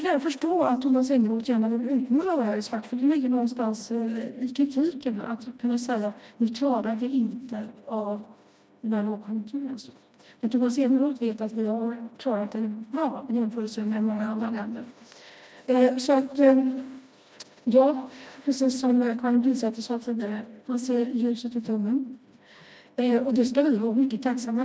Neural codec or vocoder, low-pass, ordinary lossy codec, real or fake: codec, 16 kHz, 1 kbps, FreqCodec, smaller model; none; none; fake